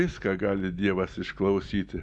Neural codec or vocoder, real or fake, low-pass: none; real; 10.8 kHz